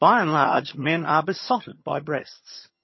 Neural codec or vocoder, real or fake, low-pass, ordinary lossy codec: vocoder, 22.05 kHz, 80 mel bands, HiFi-GAN; fake; 7.2 kHz; MP3, 24 kbps